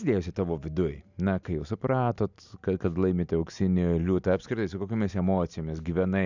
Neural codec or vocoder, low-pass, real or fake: none; 7.2 kHz; real